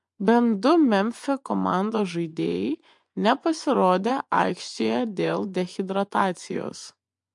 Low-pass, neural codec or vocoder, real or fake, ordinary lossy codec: 10.8 kHz; vocoder, 24 kHz, 100 mel bands, Vocos; fake; MP3, 64 kbps